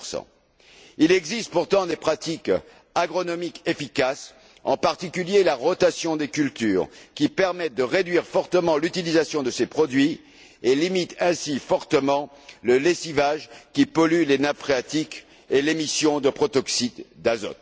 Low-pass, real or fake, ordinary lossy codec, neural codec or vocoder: none; real; none; none